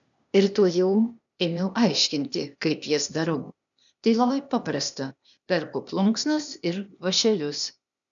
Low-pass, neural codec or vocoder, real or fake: 7.2 kHz; codec, 16 kHz, 0.8 kbps, ZipCodec; fake